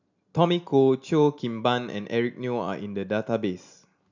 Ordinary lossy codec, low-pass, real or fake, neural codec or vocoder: none; 7.2 kHz; real; none